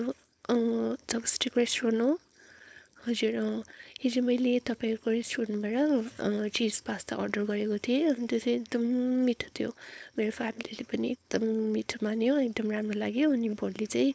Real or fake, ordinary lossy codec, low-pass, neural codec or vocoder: fake; none; none; codec, 16 kHz, 4.8 kbps, FACodec